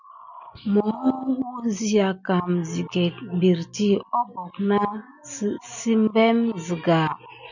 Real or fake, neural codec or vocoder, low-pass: real; none; 7.2 kHz